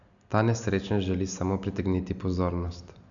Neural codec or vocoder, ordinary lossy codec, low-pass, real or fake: none; none; 7.2 kHz; real